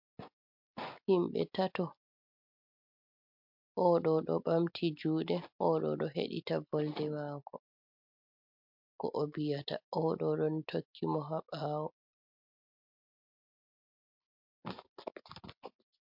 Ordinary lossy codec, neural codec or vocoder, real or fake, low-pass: MP3, 48 kbps; none; real; 5.4 kHz